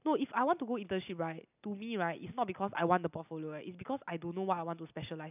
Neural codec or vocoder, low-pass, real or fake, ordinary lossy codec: none; 3.6 kHz; real; none